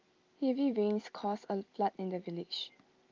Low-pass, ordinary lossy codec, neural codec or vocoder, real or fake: 7.2 kHz; Opus, 24 kbps; none; real